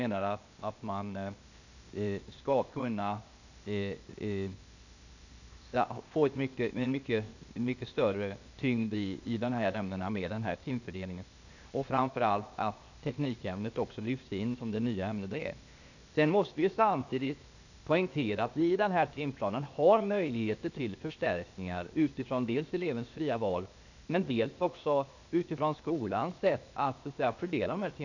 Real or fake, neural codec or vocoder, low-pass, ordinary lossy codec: fake; codec, 16 kHz, 0.8 kbps, ZipCodec; 7.2 kHz; none